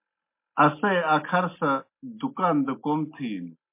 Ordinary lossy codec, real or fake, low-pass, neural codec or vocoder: MP3, 24 kbps; real; 3.6 kHz; none